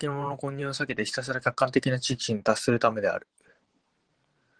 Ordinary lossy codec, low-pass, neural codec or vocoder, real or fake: Opus, 16 kbps; 9.9 kHz; vocoder, 44.1 kHz, 128 mel bands every 512 samples, BigVGAN v2; fake